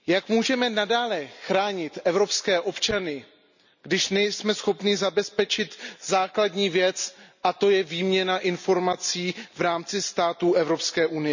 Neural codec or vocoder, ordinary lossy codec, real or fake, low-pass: none; none; real; 7.2 kHz